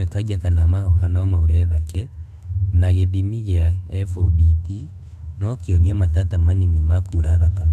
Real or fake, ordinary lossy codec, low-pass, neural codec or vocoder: fake; none; 14.4 kHz; autoencoder, 48 kHz, 32 numbers a frame, DAC-VAE, trained on Japanese speech